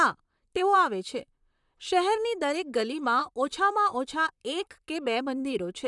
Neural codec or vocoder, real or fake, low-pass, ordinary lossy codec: vocoder, 44.1 kHz, 128 mel bands, Pupu-Vocoder; fake; 10.8 kHz; none